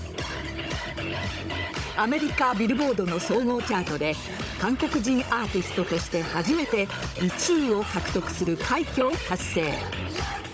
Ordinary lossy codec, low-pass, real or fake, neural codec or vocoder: none; none; fake; codec, 16 kHz, 16 kbps, FunCodec, trained on Chinese and English, 50 frames a second